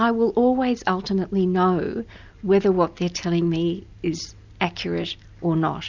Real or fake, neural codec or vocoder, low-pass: real; none; 7.2 kHz